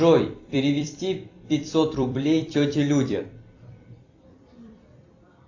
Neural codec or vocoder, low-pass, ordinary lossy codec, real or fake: vocoder, 44.1 kHz, 128 mel bands every 256 samples, BigVGAN v2; 7.2 kHz; AAC, 48 kbps; fake